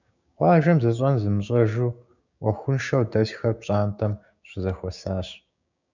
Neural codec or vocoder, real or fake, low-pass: codec, 16 kHz, 6 kbps, DAC; fake; 7.2 kHz